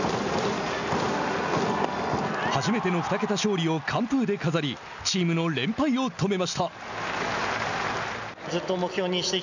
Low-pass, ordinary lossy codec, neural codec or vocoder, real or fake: 7.2 kHz; none; none; real